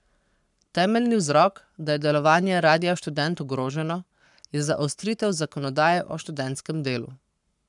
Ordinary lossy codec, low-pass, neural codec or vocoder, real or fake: none; 10.8 kHz; codec, 44.1 kHz, 7.8 kbps, Pupu-Codec; fake